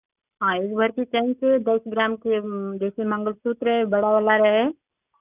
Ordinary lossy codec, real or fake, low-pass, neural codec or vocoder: none; real; 3.6 kHz; none